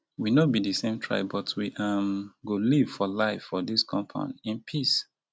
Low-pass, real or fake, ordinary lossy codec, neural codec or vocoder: none; real; none; none